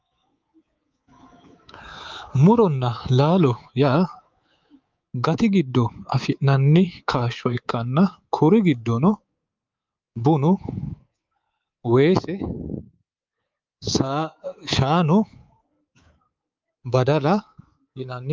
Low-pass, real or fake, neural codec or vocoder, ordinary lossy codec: 7.2 kHz; fake; codec, 24 kHz, 3.1 kbps, DualCodec; Opus, 24 kbps